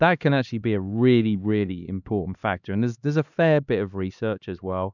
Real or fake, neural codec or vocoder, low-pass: fake; codec, 16 kHz, 2 kbps, X-Codec, HuBERT features, trained on LibriSpeech; 7.2 kHz